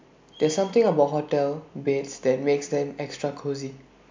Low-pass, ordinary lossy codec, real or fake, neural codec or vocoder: 7.2 kHz; MP3, 64 kbps; real; none